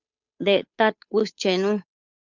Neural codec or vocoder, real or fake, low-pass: codec, 16 kHz, 8 kbps, FunCodec, trained on Chinese and English, 25 frames a second; fake; 7.2 kHz